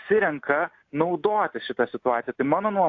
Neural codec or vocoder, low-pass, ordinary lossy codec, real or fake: none; 7.2 kHz; AAC, 48 kbps; real